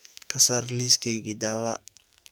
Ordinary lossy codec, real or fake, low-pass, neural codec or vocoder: none; fake; none; codec, 44.1 kHz, 2.6 kbps, SNAC